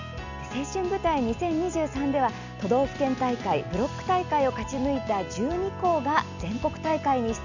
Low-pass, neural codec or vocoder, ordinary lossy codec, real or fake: 7.2 kHz; none; none; real